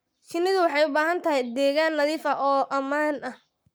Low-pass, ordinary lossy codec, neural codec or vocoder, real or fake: none; none; codec, 44.1 kHz, 7.8 kbps, Pupu-Codec; fake